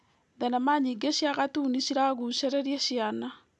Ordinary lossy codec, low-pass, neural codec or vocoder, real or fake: none; none; none; real